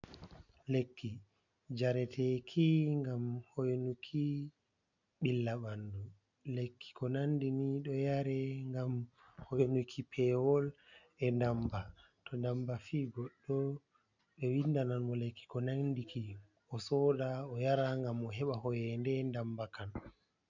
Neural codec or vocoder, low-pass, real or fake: none; 7.2 kHz; real